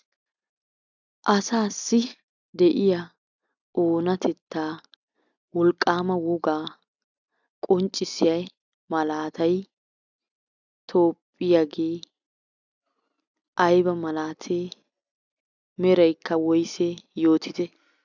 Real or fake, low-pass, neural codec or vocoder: real; 7.2 kHz; none